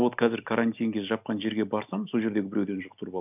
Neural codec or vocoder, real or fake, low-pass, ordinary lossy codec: none; real; 3.6 kHz; none